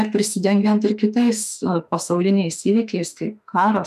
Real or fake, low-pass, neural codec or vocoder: fake; 14.4 kHz; autoencoder, 48 kHz, 32 numbers a frame, DAC-VAE, trained on Japanese speech